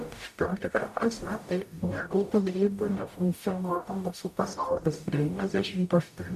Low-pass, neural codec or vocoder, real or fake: 14.4 kHz; codec, 44.1 kHz, 0.9 kbps, DAC; fake